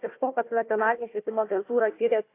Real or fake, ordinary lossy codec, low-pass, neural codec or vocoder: fake; AAC, 24 kbps; 3.6 kHz; codec, 16 kHz, 1 kbps, FunCodec, trained on Chinese and English, 50 frames a second